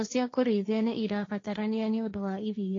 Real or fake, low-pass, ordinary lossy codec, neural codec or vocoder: fake; 7.2 kHz; AAC, 32 kbps; codec, 16 kHz, 1.1 kbps, Voila-Tokenizer